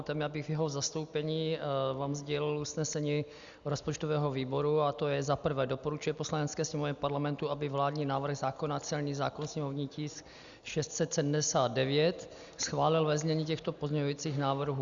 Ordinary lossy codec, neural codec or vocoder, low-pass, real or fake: Opus, 64 kbps; none; 7.2 kHz; real